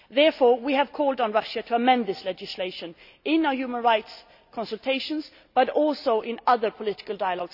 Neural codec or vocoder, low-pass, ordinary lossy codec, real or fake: none; 5.4 kHz; none; real